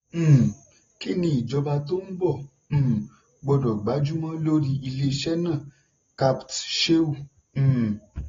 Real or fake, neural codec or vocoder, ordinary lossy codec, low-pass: real; none; AAC, 24 kbps; 7.2 kHz